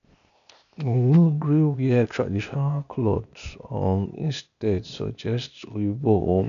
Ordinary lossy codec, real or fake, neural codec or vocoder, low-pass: none; fake; codec, 16 kHz, 0.8 kbps, ZipCodec; 7.2 kHz